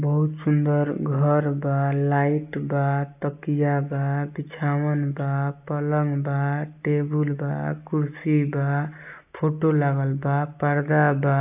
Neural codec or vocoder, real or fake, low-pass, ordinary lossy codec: none; real; 3.6 kHz; AAC, 32 kbps